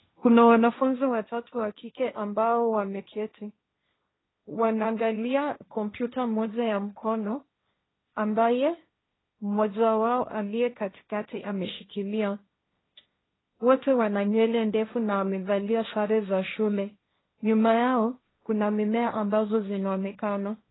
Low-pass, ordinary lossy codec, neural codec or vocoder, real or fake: 7.2 kHz; AAC, 16 kbps; codec, 16 kHz, 1.1 kbps, Voila-Tokenizer; fake